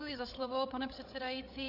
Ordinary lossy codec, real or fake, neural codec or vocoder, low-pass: AAC, 48 kbps; fake; codec, 16 kHz, 16 kbps, FreqCodec, larger model; 5.4 kHz